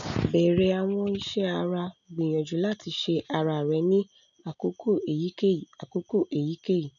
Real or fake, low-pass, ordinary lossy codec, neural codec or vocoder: real; 7.2 kHz; none; none